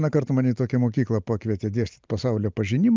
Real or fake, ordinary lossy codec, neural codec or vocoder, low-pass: real; Opus, 24 kbps; none; 7.2 kHz